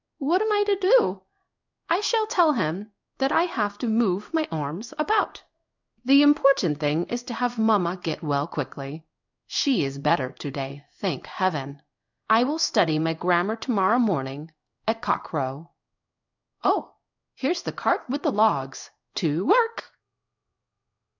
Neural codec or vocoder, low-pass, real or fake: codec, 16 kHz in and 24 kHz out, 1 kbps, XY-Tokenizer; 7.2 kHz; fake